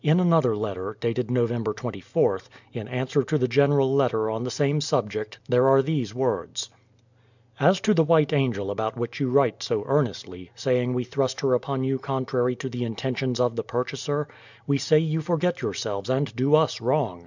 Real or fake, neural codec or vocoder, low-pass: real; none; 7.2 kHz